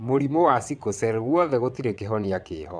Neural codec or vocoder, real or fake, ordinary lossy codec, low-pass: vocoder, 22.05 kHz, 80 mel bands, WaveNeXt; fake; none; 9.9 kHz